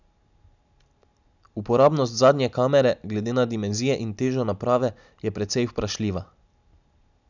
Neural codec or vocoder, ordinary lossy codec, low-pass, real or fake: none; none; 7.2 kHz; real